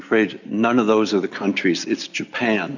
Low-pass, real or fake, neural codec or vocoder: 7.2 kHz; real; none